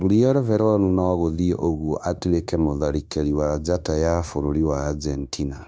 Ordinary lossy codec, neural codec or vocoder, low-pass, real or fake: none; codec, 16 kHz, 0.9 kbps, LongCat-Audio-Codec; none; fake